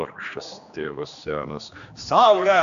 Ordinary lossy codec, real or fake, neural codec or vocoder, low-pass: AAC, 96 kbps; fake; codec, 16 kHz, 2 kbps, X-Codec, HuBERT features, trained on general audio; 7.2 kHz